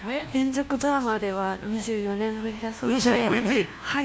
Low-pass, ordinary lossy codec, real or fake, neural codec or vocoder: none; none; fake; codec, 16 kHz, 1 kbps, FunCodec, trained on LibriTTS, 50 frames a second